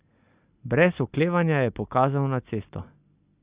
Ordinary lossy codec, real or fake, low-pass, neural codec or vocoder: Opus, 64 kbps; real; 3.6 kHz; none